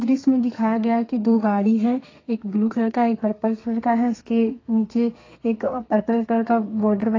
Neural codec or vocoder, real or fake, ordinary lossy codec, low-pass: codec, 32 kHz, 1.9 kbps, SNAC; fake; AAC, 32 kbps; 7.2 kHz